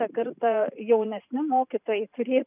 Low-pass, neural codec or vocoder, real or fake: 3.6 kHz; vocoder, 44.1 kHz, 128 mel bands every 512 samples, BigVGAN v2; fake